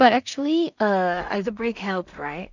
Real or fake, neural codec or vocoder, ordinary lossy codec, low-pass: fake; codec, 16 kHz in and 24 kHz out, 0.4 kbps, LongCat-Audio-Codec, two codebook decoder; none; 7.2 kHz